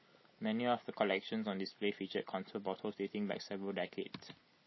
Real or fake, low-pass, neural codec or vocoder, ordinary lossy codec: real; 7.2 kHz; none; MP3, 24 kbps